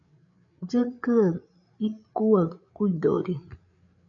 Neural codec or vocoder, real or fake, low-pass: codec, 16 kHz, 8 kbps, FreqCodec, larger model; fake; 7.2 kHz